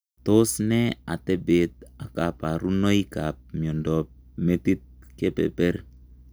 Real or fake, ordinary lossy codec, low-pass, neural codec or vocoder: real; none; none; none